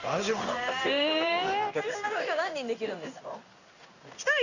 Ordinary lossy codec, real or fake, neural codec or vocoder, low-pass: none; fake; codec, 16 kHz in and 24 kHz out, 1 kbps, XY-Tokenizer; 7.2 kHz